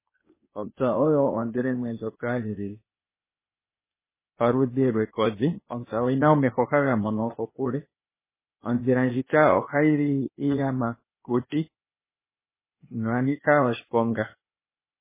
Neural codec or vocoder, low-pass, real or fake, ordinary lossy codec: codec, 16 kHz, 0.8 kbps, ZipCodec; 3.6 kHz; fake; MP3, 16 kbps